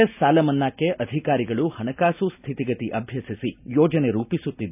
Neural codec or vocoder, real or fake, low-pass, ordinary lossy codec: none; real; 3.6 kHz; none